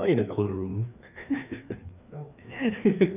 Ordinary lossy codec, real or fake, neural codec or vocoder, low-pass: none; fake; codec, 16 kHz, 2 kbps, FreqCodec, larger model; 3.6 kHz